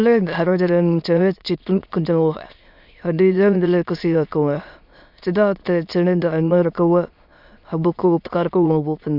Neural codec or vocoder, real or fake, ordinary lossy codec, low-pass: autoencoder, 22.05 kHz, a latent of 192 numbers a frame, VITS, trained on many speakers; fake; MP3, 48 kbps; 5.4 kHz